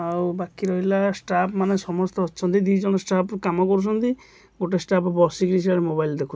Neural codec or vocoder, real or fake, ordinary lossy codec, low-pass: none; real; none; none